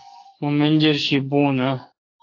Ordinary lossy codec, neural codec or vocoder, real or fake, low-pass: AAC, 48 kbps; codec, 44.1 kHz, 2.6 kbps, SNAC; fake; 7.2 kHz